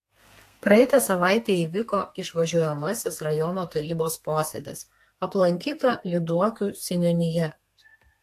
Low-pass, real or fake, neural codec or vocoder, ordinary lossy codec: 14.4 kHz; fake; codec, 32 kHz, 1.9 kbps, SNAC; AAC, 64 kbps